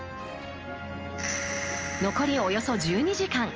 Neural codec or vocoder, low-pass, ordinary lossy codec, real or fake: none; 7.2 kHz; Opus, 24 kbps; real